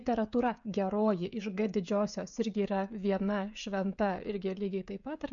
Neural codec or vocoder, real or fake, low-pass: codec, 16 kHz, 16 kbps, FreqCodec, smaller model; fake; 7.2 kHz